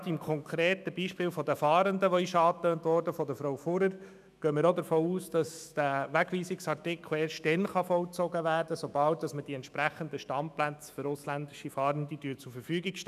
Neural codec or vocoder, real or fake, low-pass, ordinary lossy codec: autoencoder, 48 kHz, 128 numbers a frame, DAC-VAE, trained on Japanese speech; fake; 14.4 kHz; none